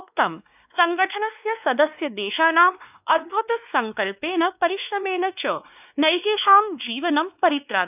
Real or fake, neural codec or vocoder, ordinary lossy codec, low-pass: fake; codec, 16 kHz, 2 kbps, X-Codec, WavLM features, trained on Multilingual LibriSpeech; none; 3.6 kHz